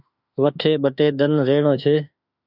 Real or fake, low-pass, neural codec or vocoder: fake; 5.4 kHz; autoencoder, 48 kHz, 32 numbers a frame, DAC-VAE, trained on Japanese speech